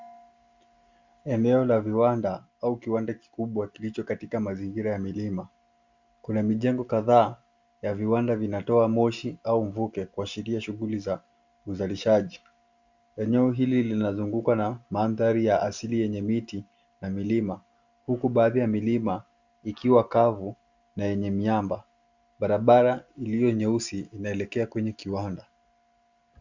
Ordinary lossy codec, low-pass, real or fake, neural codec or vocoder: Opus, 64 kbps; 7.2 kHz; real; none